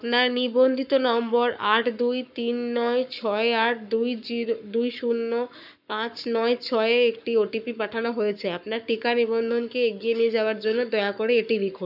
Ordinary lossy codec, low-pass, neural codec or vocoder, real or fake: none; 5.4 kHz; codec, 44.1 kHz, 7.8 kbps, Pupu-Codec; fake